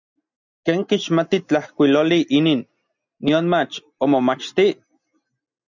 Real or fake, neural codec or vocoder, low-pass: real; none; 7.2 kHz